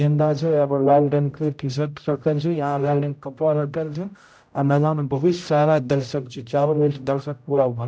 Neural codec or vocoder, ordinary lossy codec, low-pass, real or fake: codec, 16 kHz, 0.5 kbps, X-Codec, HuBERT features, trained on general audio; none; none; fake